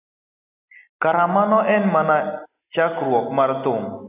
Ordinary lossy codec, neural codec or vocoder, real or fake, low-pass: AAC, 32 kbps; none; real; 3.6 kHz